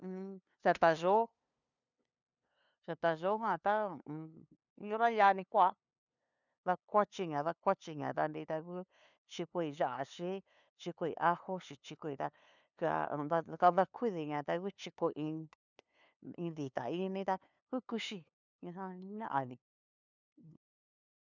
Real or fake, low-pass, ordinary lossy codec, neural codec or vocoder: fake; 7.2 kHz; none; codec, 16 kHz, 2 kbps, FunCodec, trained on LibriTTS, 25 frames a second